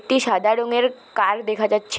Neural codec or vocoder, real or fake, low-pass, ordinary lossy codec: none; real; none; none